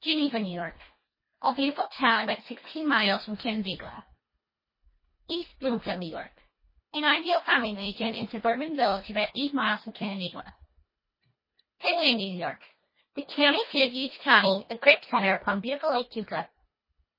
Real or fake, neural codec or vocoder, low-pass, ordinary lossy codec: fake; codec, 24 kHz, 1.5 kbps, HILCodec; 5.4 kHz; MP3, 24 kbps